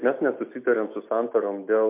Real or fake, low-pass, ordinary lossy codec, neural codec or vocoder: real; 3.6 kHz; MP3, 32 kbps; none